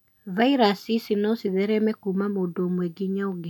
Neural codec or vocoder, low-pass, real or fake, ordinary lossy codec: none; 19.8 kHz; real; none